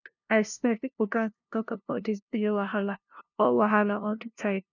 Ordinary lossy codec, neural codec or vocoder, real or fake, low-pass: none; codec, 16 kHz, 0.5 kbps, FunCodec, trained on LibriTTS, 25 frames a second; fake; 7.2 kHz